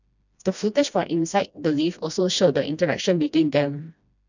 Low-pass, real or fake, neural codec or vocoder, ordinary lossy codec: 7.2 kHz; fake; codec, 16 kHz, 1 kbps, FreqCodec, smaller model; none